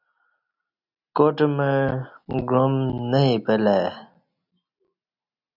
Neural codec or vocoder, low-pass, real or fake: none; 5.4 kHz; real